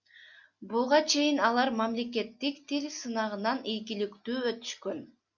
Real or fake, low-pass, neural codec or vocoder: real; 7.2 kHz; none